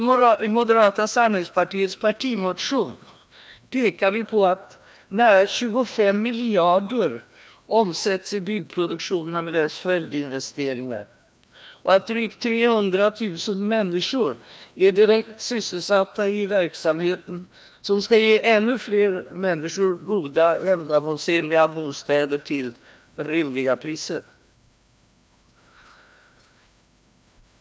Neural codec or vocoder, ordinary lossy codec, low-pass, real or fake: codec, 16 kHz, 1 kbps, FreqCodec, larger model; none; none; fake